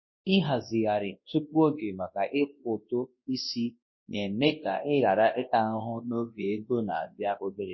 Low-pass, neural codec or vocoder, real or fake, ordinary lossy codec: 7.2 kHz; codec, 24 kHz, 0.9 kbps, WavTokenizer, large speech release; fake; MP3, 24 kbps